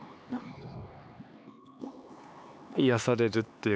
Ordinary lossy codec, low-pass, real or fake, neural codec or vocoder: none; none; fake; codec, 16 kHz, 4 kbps, X-Codec, HuBERT features, trained on LibriSpeech